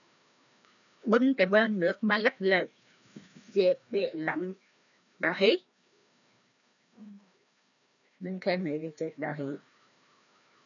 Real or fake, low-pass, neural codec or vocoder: fake; 7.2 kHz; codec, 16 kHz, 1 kbps, FreqCodec, larger model